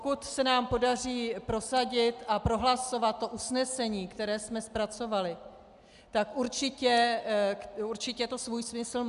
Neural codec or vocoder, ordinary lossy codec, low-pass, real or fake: none; MP3, 96 kbps; 10.8 kHz; real